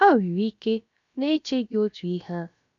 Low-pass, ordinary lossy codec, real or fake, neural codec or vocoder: 7.2 kHz; none; fake; codec, 16 kHz, about 1 kbps, DyCAST, with the encoder's durations